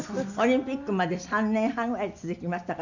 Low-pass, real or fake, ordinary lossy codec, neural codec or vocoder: 7.2 kHz; real; none; none